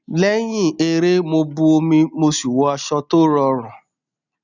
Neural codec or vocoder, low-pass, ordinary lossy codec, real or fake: none; 7.2 kHz; none; real